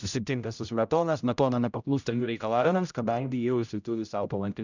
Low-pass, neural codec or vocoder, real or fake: 7.2 kHz; codec, 16 kHz, 0.5 kbps, X-Codec, HuBERT features, trained on general audio; fake